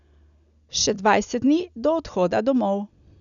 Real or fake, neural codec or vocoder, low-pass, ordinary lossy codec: real; none; 7.2 kHz; none